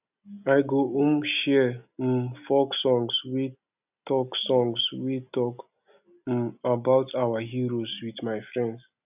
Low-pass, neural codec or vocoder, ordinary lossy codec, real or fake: 3.6 kHz; none; none; real